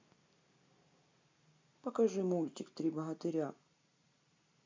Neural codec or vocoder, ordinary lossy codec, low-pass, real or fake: none; MP3, 64 kbps; 7.2 kHz; real